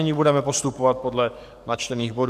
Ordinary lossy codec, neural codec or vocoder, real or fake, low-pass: MP3, 96 kbps; codec, 44.1 kHz, 7.8 kbps, DAC; fake; 14.4 kHz